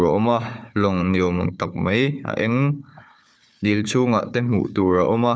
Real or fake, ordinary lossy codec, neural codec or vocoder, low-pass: fake; none; codec, 16 kHz, 4 kbps, FunCodec, trained on Chinese and English, 50 frames a second; none